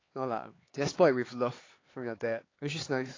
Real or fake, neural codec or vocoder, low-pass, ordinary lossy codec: fake; codec, 16 kHz, 4 kbps, X-Codec, HuBERT features, trained on LibriSpeech; 7.2 kHz; AAC, 32 kbps